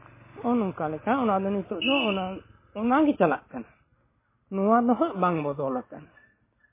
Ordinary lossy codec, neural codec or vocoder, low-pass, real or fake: MP3, 16 kbps; none; 3.6 kHz; real